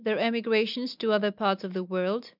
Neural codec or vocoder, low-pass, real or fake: none; 5.4 kHz; real